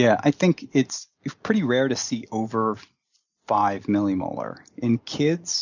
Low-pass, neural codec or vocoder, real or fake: 7.2 kHz; none; real